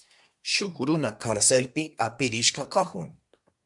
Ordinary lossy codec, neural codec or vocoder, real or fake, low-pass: MP3, 96 kbps; codec, 24 kHz, 1 kbps, SNAC; fake; 10.8 kHz